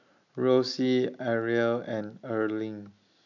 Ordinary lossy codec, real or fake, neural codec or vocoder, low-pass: none; real; none; 7.2 kHz